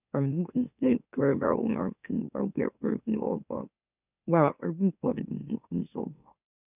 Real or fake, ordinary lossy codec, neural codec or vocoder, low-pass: fake; none; autoencoder, 44.1 kHz, a latent of 192 numbers a frame, MeloTTS; 3.6 kHz